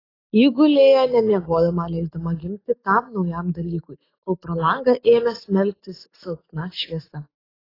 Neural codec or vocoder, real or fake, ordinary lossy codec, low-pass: autoencoder, 48 kHz, 128 numbers a frame, DAC-VAE, trained on Japanese speech; fake; AAC, 24 kbps; 5.4 kHz